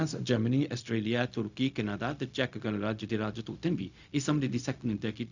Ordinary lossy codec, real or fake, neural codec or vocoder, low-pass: none; fake; codec, 16 kHz, 0.4 kbps, LongCat-Audio-Codec; 7.2 kHz